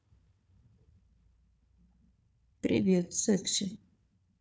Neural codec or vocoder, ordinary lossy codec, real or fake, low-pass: codec, 16 kHz, 4 kbps, FunCodec, trained on Chinese and English, 50 frames a second; none; fake; none